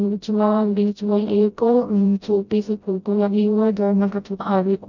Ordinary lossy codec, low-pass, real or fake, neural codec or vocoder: none; 7.2 kHz; fake; codec, 16 kHz, 0.5 kbps, FreqCodec, smaller model